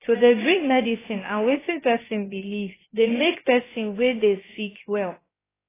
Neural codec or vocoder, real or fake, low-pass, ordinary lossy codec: codec, 16 kHz, about 1 kbps, DyCAST, with the encoder's durations; fake; 3.6 kHz; AAC, 16 kbps